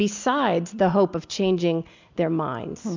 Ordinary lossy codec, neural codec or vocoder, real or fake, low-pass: MP3, 64 kbps; none; real; 7.2 kHz